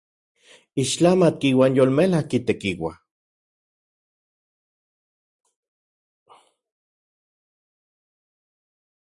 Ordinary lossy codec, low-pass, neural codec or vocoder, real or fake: Opus, 64 kbps; 10.8 kHz; none; real